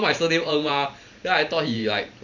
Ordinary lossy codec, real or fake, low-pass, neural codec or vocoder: none; fake; 7.2 kHz; vocoder, 44.1 kHz, 128 mel bands every 512 samples, BigVGAN v2